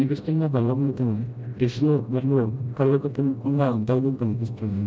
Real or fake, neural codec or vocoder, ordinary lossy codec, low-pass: fake; codec, 16 kHz, 0.5 kbps, FreqCodec, smaller model; none; none